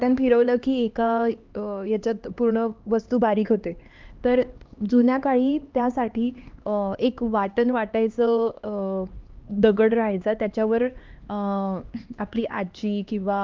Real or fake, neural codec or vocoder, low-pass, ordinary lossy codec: fake; codec, 16 kHz, 2 kbps, X-Codec, HuBERT features, trained on LibriSpeech; 7.2 kHz; Opus, 24 kbps